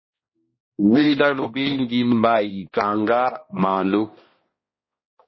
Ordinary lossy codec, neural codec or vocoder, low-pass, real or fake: MP3, 24 kbps; codec, 16 kHz, 1 kbps, X-Codec, HuBERT features, trained on balanced general audio; 7.2 kHz; fake